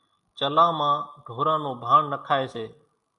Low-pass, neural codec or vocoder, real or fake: 10.8 kHz; none; real